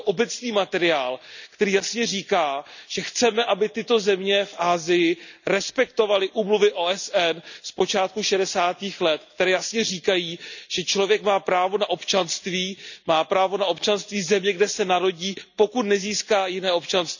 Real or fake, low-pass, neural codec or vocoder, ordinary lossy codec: real; 7.2 kHz; none; none